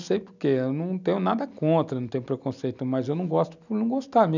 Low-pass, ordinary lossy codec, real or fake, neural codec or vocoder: 7.2 kHz; none; real; none